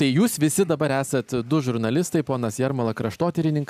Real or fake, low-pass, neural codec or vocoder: real; 14.4 kHz; none